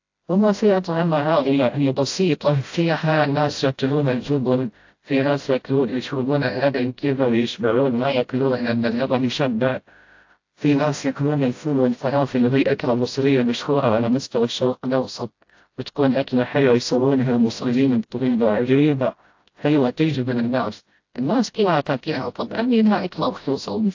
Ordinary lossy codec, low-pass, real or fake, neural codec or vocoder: AAC, 48 kbps; 7.2 kHz; fake; codec, 16 kHz, 0.5 kbps, FreqCodec, smaller model